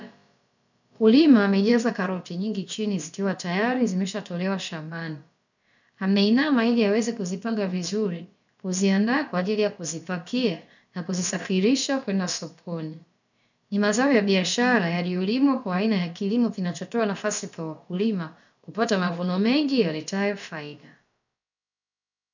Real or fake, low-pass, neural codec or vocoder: fake; 7.2 kHz; codec, 16 kHz, about 1 kbps, DyCAST, with the encoder's durations